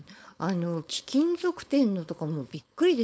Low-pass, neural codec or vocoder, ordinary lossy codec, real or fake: none; codec, 16 kHz, 4.8 kbps, FACodec; none; fake